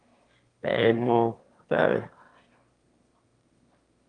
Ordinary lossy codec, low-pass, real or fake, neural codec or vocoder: Opus, 32 kbps; 9.9 kHz; fake; autoencoder, 22.05 kHz, a latent of 192 numbers a frame, VITS, trained on one speaker